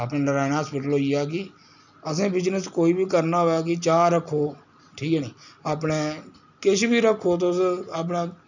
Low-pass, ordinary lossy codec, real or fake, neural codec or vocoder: 7.2 kHz; none; real; none